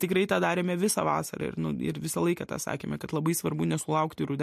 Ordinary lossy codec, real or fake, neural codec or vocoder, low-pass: MP3, 64 kbps; real; none; 14.4 kHz